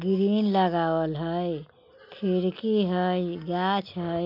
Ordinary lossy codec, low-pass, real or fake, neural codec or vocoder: none; 5.4 kHz; real; none